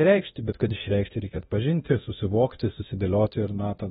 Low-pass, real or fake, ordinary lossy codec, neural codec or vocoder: 10.8 kHz; fake; AAC, 16 kbps; codec, 24 kHz, 0.9 kbps, DualCodec